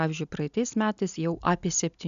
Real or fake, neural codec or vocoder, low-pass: real; none; 7.2 kHz